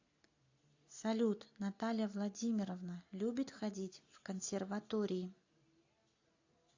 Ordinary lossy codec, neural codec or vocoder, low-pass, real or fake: AAC, 48 kbps; none; 7.2 kHz; real